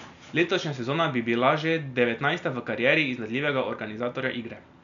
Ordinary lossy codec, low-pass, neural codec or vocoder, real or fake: none; 7.2 kHz; none; real